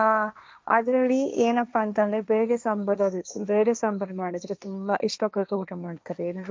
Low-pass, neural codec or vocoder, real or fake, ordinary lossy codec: none; codec, 16 kHz, 1.1 kbps, Voila-Tokenizer; fake; none